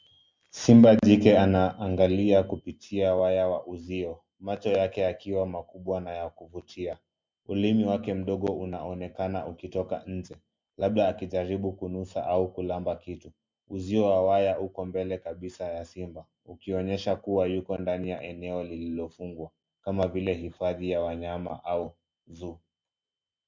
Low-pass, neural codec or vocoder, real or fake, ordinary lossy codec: 7.2 kHz; none; real; MP3, 64 kbps